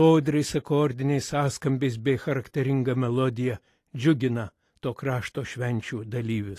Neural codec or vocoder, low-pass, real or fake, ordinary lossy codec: none; 14.4 kHz; real; AAC, 48 kbps